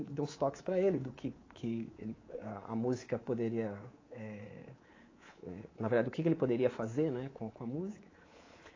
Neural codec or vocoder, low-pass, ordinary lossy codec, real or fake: codec, 24 kHz, 3.1 kbps, DualCodec; 7.2 kHz; AAC, 32 kbps; fake